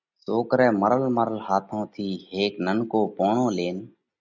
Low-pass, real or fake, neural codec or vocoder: 7.2 kHz; real; none